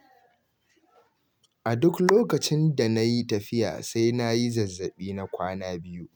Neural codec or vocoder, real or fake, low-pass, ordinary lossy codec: none; real; none; none